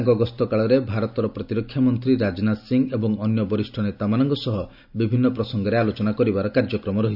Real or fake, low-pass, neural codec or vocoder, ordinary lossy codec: real; 5.4 kHz; none; none